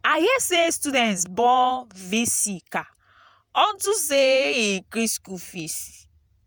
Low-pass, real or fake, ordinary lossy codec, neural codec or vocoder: none; fake; none; vocoder, 48 kHz, 128 mel bands, Vocos